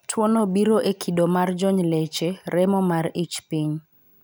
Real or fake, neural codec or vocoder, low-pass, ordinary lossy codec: real; none; none; none